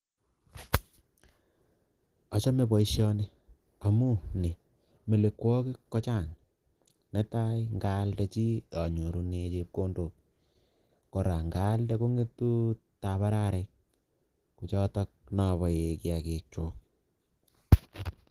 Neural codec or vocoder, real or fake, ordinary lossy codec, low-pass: none; real; Opus, 16 kbps; 14.4 kHz